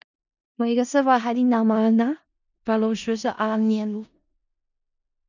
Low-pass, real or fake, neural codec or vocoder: 7.2 kHz; fake; codec, 16 kHz in and 24 kHz out, 0.4 kbps, LongCat-Audio-Codec, four codebook decoder